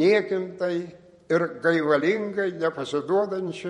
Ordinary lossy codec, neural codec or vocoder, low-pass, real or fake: MP3, 48 kbps; vocoder, 48 kHz, 128 mel bands, Vocos; 19.8 kHz; fake